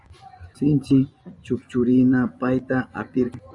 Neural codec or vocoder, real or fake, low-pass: vocoder, 24 kHz, 100 mel bands, Vocos; fake; 10.8 kHz